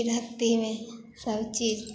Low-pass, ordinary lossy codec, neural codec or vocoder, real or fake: none; none; none; real